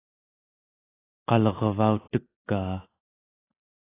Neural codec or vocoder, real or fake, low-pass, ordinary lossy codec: none; real; 3.6 kHz; AAC, 16 kbps